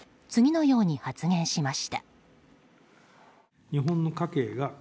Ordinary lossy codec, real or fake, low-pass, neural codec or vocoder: none; real; none; none